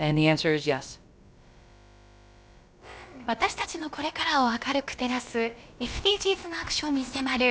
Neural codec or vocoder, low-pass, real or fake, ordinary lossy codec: codec, 16 kHz, about 1 kbps, DyCAST, with the encoder's durations; none; fake; none